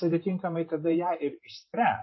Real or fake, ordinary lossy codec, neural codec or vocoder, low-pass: fake; MP3, 24 kbps; vocoder, 44.1 kHz, 128 mel bands every 512 samples, BigVGAN v2; 7.2 kHz